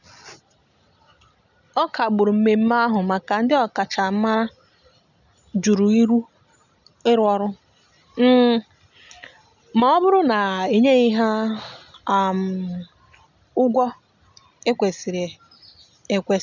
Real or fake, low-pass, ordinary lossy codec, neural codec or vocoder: real; 7.2 kHz; none; none